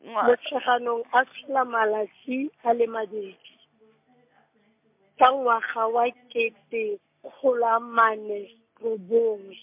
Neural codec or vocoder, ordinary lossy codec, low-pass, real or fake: none; MP3, 32 kbps; 3.6 kHz; real